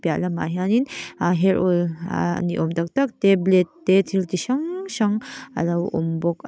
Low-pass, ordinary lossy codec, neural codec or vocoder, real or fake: none; none; none; real